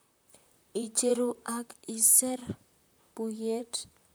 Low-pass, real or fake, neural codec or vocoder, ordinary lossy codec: none; fake; vocoder, 44.1 kHz, 128 mel bands, Pupu-Vocoder; none